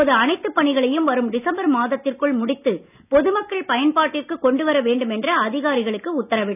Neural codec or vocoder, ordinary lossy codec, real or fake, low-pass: none; none; real; 3.6 kHz